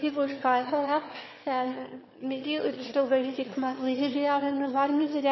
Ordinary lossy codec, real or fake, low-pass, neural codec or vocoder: MP3, 24 kbps; fake; 7.2 kHz; autoencoder, 22.05 kHz, a latent of 192 numbers a frame, VITS, trained on one speaker